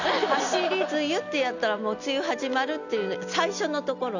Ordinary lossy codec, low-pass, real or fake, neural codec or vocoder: none; 7.2 kHz; real; none